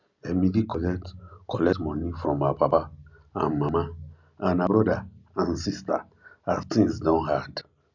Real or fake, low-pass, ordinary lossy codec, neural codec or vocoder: real; 7.2 kHz; none; none